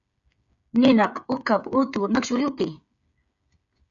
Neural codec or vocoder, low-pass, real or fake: codec, 16 kHz, 8 kbps, FreqCodec, smaller model; 7.2 kHz; fake